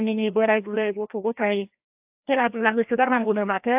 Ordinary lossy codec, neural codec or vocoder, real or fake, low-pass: AAC, 32 kbps; codec, 16 kHz, 1 kbps, FreqCodec, larger model; fake; 3.6 kHz